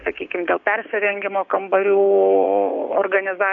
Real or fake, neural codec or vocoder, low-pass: fake; codec, 16 kHz, 6 kbps, DAC; 7.2 kHz